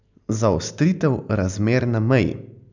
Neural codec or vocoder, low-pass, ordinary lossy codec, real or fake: none; 7.2 kHz; none; real